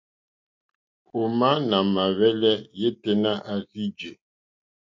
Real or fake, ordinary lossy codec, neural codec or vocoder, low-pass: real; MP3, 48 kbps; none; 7.2 kHz